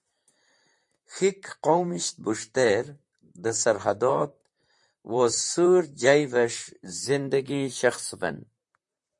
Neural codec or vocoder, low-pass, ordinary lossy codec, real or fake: vocoder, 44.1 kHz, 128 mel bands, Pupu-Vocoder; 10.8 kHz; MP3, 48 kbps; fake